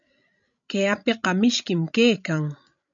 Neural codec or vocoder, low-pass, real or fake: codec, 16 kHz, 16 kbps, FreqCodec, larger model; 7.2 kHz; fake